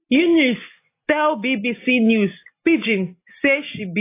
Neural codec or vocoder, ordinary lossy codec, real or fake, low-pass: none; AAC, 24 kbps; real; 3.6 kHz